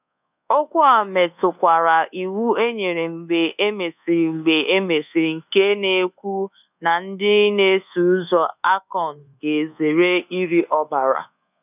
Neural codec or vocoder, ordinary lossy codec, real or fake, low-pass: codec, 24 kHz, 1.2 kbps, DualCodec; none; fake; 3.6 kHz